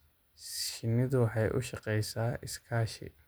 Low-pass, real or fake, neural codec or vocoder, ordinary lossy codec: none; real; none; none